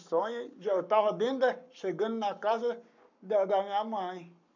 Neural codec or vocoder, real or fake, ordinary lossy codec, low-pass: codec, 44.1 kHz, 7.8 kbps, Pupu-Codec; fake; none; 7.2 kHz